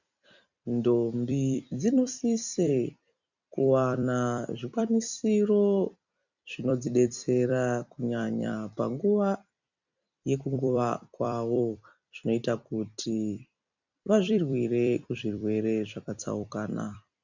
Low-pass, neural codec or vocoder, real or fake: 7.2 kHz; vocoder, 44.1 kHz, 128 mel bands every 256 samples, BigVGAN v2; fake